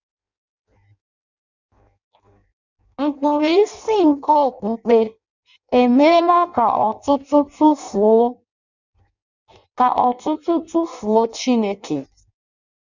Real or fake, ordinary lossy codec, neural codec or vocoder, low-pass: fake; none; codec, 16 kHz in and 24 kHz out, 0.6 kbps, FireRedTTS-2 codec; 7.2 kHz